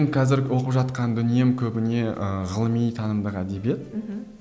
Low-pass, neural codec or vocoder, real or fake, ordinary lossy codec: none; none; real; none